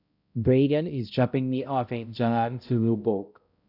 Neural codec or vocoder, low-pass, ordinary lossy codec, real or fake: codec, 16 kHz, 0.5 kbps, X-Codec, HuBERT features, trained on balanced general audio; 5.4 kHz; none; fake